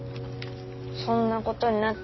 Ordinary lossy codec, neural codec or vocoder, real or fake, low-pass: MP3, 24 kbps; none; real; 7.2 kHz